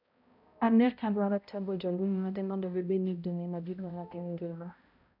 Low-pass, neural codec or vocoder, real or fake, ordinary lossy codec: 5.4 kHz; codec, 16 kHz, 0.5 kbps, X-Codec, HuBERT features, trained on balanced general audio; fake; none